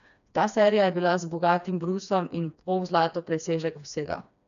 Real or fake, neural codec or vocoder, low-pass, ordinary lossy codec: fake; codec, 16 kHz, 2 kbps, FreqCodec, smaller model; 7.2 kHz; none